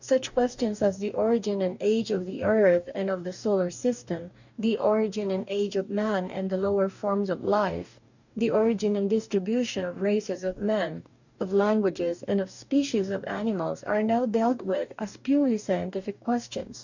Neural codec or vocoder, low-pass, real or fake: codec, 44.1 kHz, 2.6 kbps, DAC; 7.2 kHz; fake